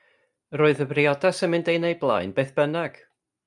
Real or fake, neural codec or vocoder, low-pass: real; none; 10.8 kHz